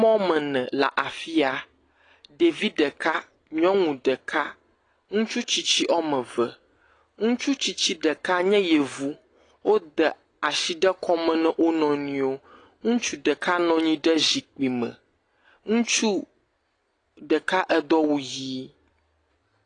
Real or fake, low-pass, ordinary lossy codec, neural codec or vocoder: real; 9.9 kHz; AAC, 32 kbps; none